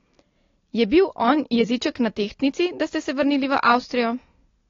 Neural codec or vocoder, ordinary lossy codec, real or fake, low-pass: none; AAC, 32 kbps; real; 7.2 kHz